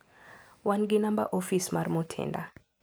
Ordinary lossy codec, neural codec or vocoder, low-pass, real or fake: none; none; none; real